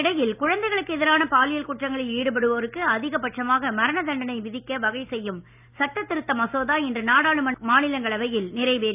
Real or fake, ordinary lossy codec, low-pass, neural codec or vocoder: real; none; 3.6 kHz; none